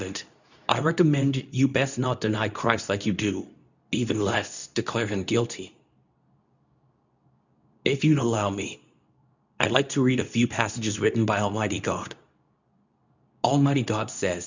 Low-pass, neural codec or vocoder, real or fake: 7.2 kHz; codec, 24 kHz, 0.9 kbps, WavTokenizer, medium speech release version 2; fake